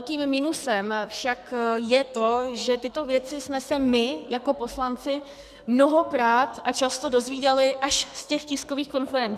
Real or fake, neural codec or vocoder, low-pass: fake; codec, 44.1 kHz, 2.6 kbps, SNAC; 14.4 kHz